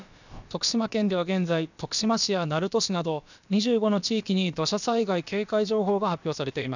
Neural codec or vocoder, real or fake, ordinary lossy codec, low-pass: codec, 16 kHz, about 1 kbps, DyCAST, with the encoder's durations; fake; none; 7.2 kHz